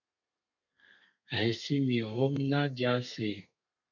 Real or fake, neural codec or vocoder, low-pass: fake; codec, 32 kHz, 1.9 kbps, SNAC; 7.2 kHz